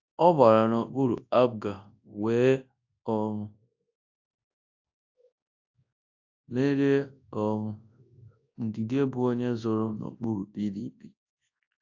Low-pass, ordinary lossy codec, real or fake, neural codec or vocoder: 7.2 kHz; AAC, 48 kbps; fake; codec, 24 kHz, 0.9 kbps, WavTokenizer, large speech release